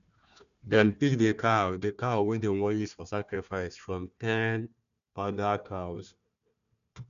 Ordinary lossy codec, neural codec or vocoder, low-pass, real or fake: none; codec, 16 kHz, 1 kbps, FunCodec, trained on Chinese and English, 50 frames a second; 7.2 kHz; fake